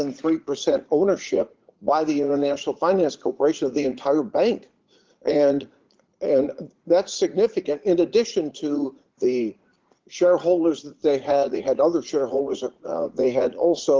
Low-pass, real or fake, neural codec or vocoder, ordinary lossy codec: 7.2 kHz; fake; vocoder, 44.1 kHz, 80 mel bands, Vocos; Opus, 32 kbps